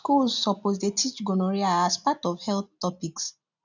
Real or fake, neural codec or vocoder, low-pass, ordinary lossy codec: real; none; 7.2 kHz; none